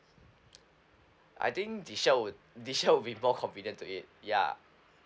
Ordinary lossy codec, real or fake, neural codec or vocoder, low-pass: none; real; none; none